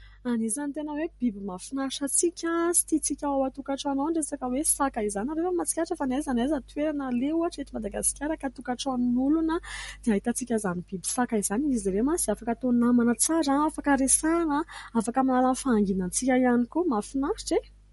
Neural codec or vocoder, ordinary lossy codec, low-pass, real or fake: none; MP3, 48 kbps; 19.8 kHz; real